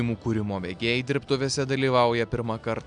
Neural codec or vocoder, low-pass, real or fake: none; 9.9 kHz; real